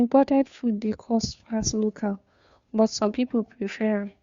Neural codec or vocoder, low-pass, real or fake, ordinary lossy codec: codec, 16 kHz, 2 kbps, FunCodec, trained on Chinese and English, 25 frames a second; 7.2 kHz; fake; Opus, 64 kbps